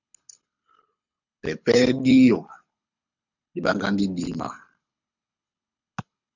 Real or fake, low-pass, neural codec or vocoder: fake; 7.2 kHz; codec, 24 kHz, 6 kbps, HILCodec